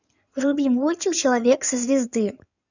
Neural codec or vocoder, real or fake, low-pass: codec, 16 kHz in and 24 kHz out, 2.2 kbps, FireRedTTS-2 codec; fake; 7.2 kHz